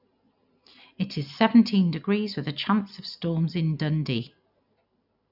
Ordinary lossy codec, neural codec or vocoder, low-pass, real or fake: none; none; 5.4 kHz; real